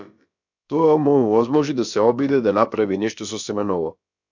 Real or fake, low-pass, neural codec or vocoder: fake; 7.2 kHz; codec, 16 kHz, about 1 kbps, DyCAST, with the encoder's durations